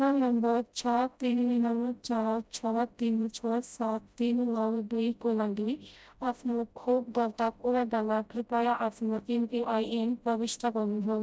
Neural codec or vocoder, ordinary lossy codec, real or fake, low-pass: codec, 16 kHz, 0.5 kbps, FreqCodec, smaller model; none; fake; none